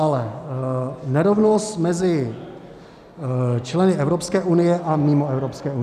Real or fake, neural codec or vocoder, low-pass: real; none; 14.4 kHz